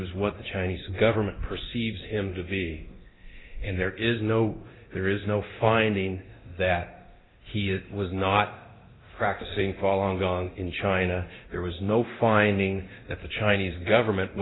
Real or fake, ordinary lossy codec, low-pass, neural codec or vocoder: fake; AAC, 16 kbps; 7.2 kHz; codec, 24 kHz, 0.9 kbps, DualCodec